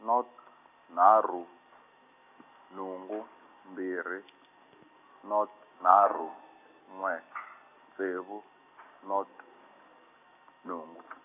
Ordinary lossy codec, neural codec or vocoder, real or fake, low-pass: none; none; real; 3.6 kHz